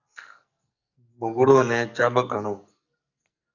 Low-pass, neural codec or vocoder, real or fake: 7.2 kHz; codec, 44.1 kHz, 2.6 kbps, SNAC; fake